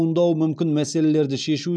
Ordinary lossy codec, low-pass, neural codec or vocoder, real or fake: none; 9.9 kHz; none; real